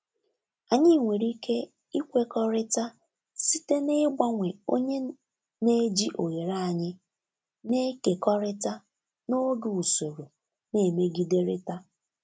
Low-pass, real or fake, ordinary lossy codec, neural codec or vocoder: none; real; none; none